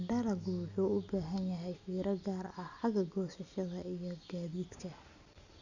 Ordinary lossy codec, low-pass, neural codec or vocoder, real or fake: none; 7.2 kHz; none; real